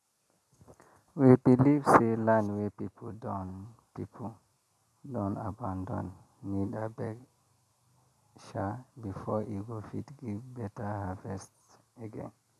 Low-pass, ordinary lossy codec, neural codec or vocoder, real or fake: 14.4 kHz; none; none; real